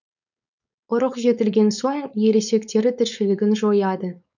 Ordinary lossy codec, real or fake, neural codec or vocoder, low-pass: none; fake; codec, 16 kHz, 4.8 kbps, FACodec; 7.2 kHz